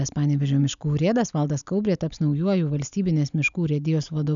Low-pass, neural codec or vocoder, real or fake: 7.2 kHz; none; real